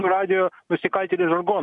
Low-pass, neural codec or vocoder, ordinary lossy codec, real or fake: 10.8 kHz; none; MP3, 64 kbps; real